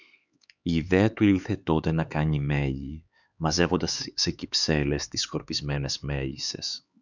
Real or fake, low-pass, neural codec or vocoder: fake; 7.2 kHz; codec, 16 kHz, 4 kbps, X-Codec, HuBERT features, trained on LibriSpeech